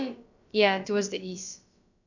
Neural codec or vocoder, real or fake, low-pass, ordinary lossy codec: codec, 16 kHz, about 1 kbps, DyCAST, with the encoder's durations; fake; 7.2 kHz; none